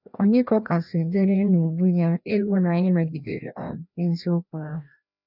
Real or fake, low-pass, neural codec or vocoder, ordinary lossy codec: fake; 5.4 kHz; codec, 16 kHz, 1 kbps, FreqCodec, larger model; none